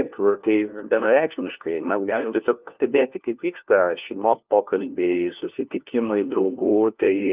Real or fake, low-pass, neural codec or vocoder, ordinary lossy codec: fake; 3.6 kHz; codec, 16 kHz, 1 kbps, FunCodec, trained on LibriTTS, 50 frames a second; Opus, 24 kbps